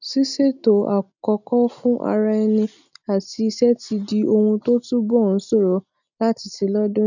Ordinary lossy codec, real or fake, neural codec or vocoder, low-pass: none; real; none; 7.2 kHz